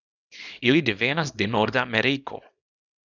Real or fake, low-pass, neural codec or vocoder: fake; 7.2 kHz; codec, 24 kHz, 0.9 kbps, WavTokenizer, small release